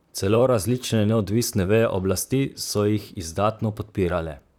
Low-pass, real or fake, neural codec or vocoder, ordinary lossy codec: none; fake; vocoder, 44.1 kHz, 128 mel bands, Pupu-Vocoder; none